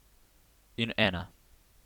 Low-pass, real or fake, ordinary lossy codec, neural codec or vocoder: 19.8 kHz; fake; none; vocoder, 44.1 kHz, 128 mel bands every 256 samples, BigVGAN v2